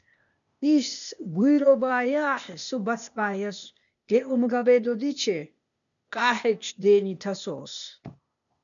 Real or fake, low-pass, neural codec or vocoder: fake; 7.2 kHz; codec, 16 kHz, 0.8 kbps, ZipCodec